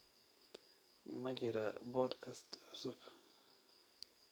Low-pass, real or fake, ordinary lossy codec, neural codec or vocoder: none; fake; none; codec, 44.1 kHz, 2.6 kbps, SNAC